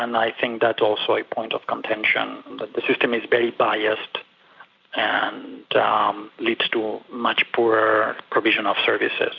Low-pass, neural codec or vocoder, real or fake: 7.2 kHz; none; real